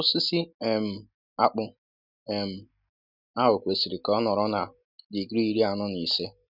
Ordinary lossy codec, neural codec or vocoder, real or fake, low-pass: none; none; real; 5.4 kHz